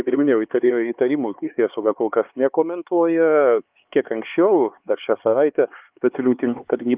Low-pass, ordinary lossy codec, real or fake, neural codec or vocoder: 3.6 kHz; Opus, 24 kbps; fake; codec, 16 kHz, 4 kbps, X-Codec, HuBERT features, trained on LibriSpeech